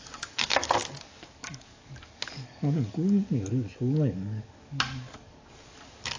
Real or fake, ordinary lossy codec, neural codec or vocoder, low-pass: fake; AAC, 48 kbps; codec, 44.1 kHz, 7.8 kbps, DAC; 7.2 kHz